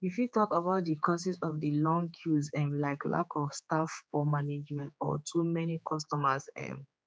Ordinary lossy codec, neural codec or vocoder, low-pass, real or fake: none; codec, 16 kHz, 4 kbps, X-Codec, HuBERT features, trained on general audio; none; fake